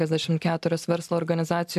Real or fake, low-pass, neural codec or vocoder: real; 14.4 kHz; none